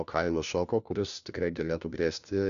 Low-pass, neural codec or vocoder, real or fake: 7.2 kHz; codec, 16 kHz, 1 kbps, FunCodec, trained on LibriTTS, 50 frames a second; fake